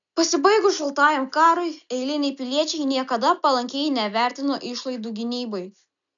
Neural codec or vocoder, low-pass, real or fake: none; 7.2 kHz; real